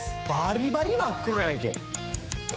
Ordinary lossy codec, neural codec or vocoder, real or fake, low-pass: none; codec, 16 kHz, 4 kbps, X-Codec, HuBERT features, trained on general audio; fake; none